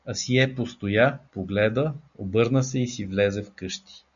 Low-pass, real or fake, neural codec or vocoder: 7.2 kHz; real; none